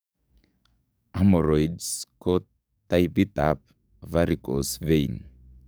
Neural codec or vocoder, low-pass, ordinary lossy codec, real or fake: codec, 44.1 kHz, 7.8 kbps, DAC; none; none; fake